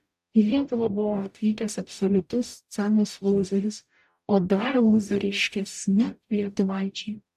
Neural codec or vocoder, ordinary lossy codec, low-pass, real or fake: codec, 44.1 kHz, 0.9 kbps, DAC; AAC, 96 kbps; 14.4 kHz; fake